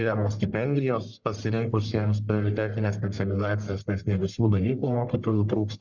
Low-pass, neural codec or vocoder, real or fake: 7.2 kHz; codec, 44.1 kHz, 1.7 kbps, Pupu-Codec; fake